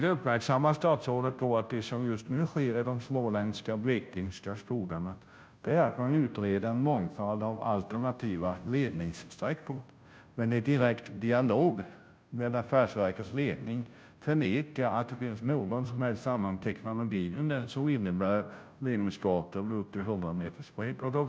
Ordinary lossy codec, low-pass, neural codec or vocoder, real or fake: none; none; codec, 16 kHz, 0.5 kbps, FunCodec, trained on Chinese and English, 25 frames a second; fake